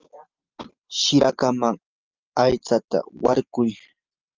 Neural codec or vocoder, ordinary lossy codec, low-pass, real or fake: none; Opus, 32 kbps; 7.2 kHz; real